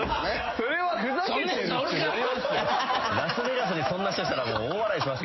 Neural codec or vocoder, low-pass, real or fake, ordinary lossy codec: none; 7.2 kHz; real; MP3, 24 kbps